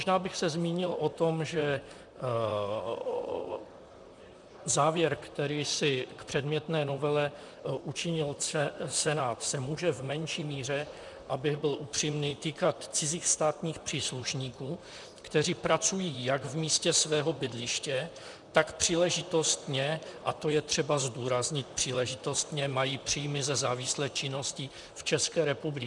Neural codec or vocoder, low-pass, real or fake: vocoder, 44.1 kHz, 128 mel bands, Pupu-Vocoder; 10.8 kHz; fake